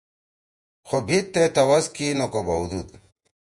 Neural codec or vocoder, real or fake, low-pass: vocoder, 48 kHz, 128 mel bands, Vocos; fake; 10.8 kHz